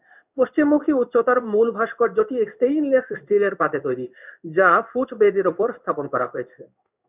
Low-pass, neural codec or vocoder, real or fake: 3.6 kHz; codec, 16 kHz in and 24 kHz out, 1 kbps, XY-Tokenizer; fake